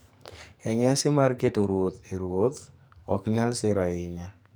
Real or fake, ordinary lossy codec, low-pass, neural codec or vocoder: fake; none; none; codec, 44.1 kHz, 2.6 kbps, SNAC